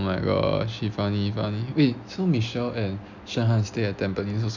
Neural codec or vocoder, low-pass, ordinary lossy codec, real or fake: none; 7.2 kHz; none; real